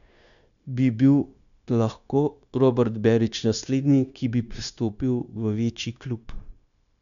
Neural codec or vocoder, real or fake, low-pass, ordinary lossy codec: codec, 16 kHz, 0.9 kbps, LongCat-Audio-Codec; fake; 7.2 kHz; none